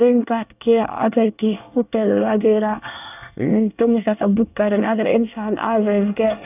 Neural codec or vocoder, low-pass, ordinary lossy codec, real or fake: codec, 24 kHz, 1 kbps, SNAC; 3.6 kHz; none; fake